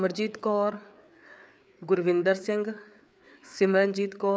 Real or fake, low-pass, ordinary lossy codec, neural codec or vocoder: fake; none; none; codec, 16 kHz, 4 kbps, FreqCodec, larger model